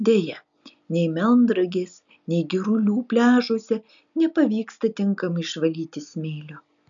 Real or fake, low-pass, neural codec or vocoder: real; 7.2 kHz; none